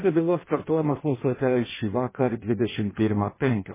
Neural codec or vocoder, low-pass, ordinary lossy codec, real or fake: codec, 16 kHz in and 24 kHz out, 0.6 kbps, FireRedTTS-2 codec; 3.6 kHz; MP3, 16 kbps; fake